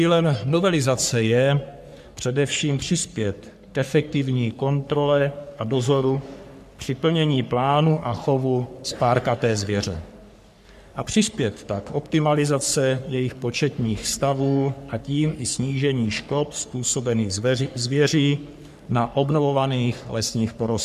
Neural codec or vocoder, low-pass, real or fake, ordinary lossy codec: codec, 44.1 kHz, 3.4 kbps, Pupu-Codec; 14.4 kHz; fake; MP3, 96 kbps